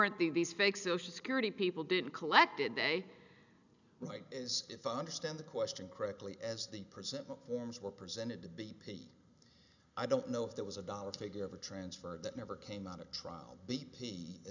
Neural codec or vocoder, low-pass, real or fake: none; 7.2 kHz; real